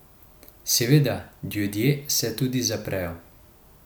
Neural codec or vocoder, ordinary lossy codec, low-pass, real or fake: none; none; none; real